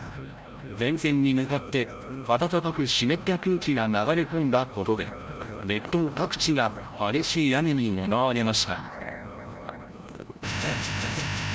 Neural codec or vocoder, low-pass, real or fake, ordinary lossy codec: codec, 16 kHz, 0.5 kbps, FreqCodec, larger model; none; fake; none